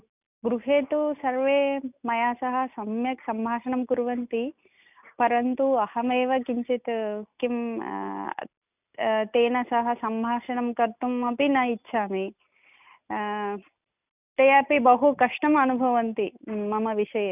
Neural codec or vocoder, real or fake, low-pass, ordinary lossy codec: none; real; 3.6 kHz; none